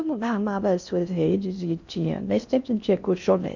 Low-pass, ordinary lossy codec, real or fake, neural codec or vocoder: 7.2 kHz; none; fake; codec, 16 kHz in and 24 kHz out, 0.6 kbps, FocalCodec, streaming, 4096 codes